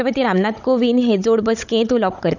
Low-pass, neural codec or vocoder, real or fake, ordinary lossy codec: 7.2 kHz; codec, 16 kHz, 16 kbps, FunCodec, trained on Chinese and English, 50 frames a second; fake; none